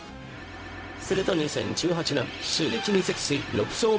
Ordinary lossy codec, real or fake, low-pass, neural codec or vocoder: none; fake; none; codec, 16 kHz, 0.4 kbps, LongCat-Audio-Codec